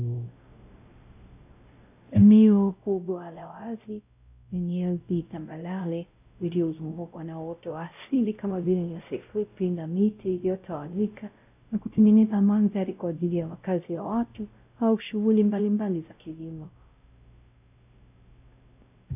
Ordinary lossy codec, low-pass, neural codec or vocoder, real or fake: AAC, 32 kbps; 3.6 kHz; codec, 16 kHz, 0.5 kbps, X-Codec, WavLM features, trained on Multilingual LibriSpeech; fake